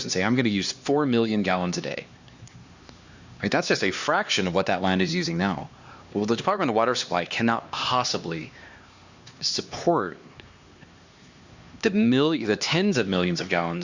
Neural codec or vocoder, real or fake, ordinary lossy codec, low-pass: codec, 16 kHz, 2 kbps, X-Codec, HuBERT features, trained on LibriSpeech; fake; Opus, 64 kbps; 7.2 kHz